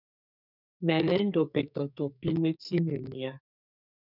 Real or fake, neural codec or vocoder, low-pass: fake; codec, 44.1 kHz, 2.6 kbps, SNAC; 5.4 kHz